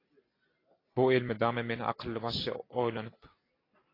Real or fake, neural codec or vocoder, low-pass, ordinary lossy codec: real; none; 5.4 kHz; AAC, 24 kbps